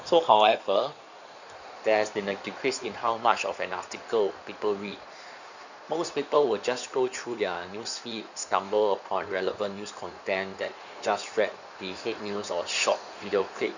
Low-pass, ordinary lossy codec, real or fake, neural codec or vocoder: 7.2 kHz; none; fake; codec, 16 kHz in and 24 kHz out, 2.2 kbps, FireRedTTS-2 codec